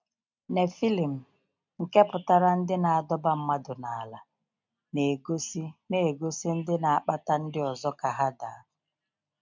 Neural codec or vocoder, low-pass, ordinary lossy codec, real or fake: none; 7.2 kHz; MP3, 64 kbps; real